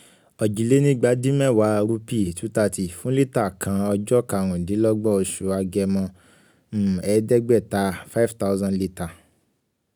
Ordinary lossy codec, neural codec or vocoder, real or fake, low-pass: none; none; real; none